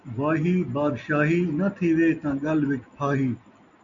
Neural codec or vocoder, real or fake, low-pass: none; real; 7.2 kHz